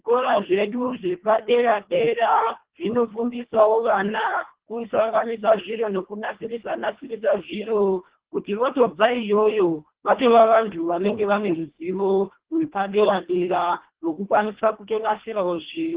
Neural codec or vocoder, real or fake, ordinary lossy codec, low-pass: codec, 24 kHz, 1.5 kbps, HILCodec; fake; Opus, 16 kbps; 3.6 kHz